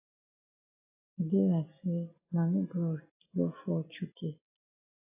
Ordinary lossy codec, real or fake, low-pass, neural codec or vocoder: AAC, 24 kbps; real; 3.6 kHz; none